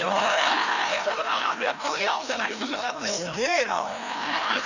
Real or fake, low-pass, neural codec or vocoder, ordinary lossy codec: fake; 7.2 kHz; codec, 16 kHz, 1 kbps, FreqCodec, larger model; none